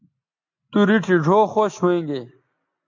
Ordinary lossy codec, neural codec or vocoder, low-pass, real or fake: AAC, 48 kbps; none; 7.2 kHz; real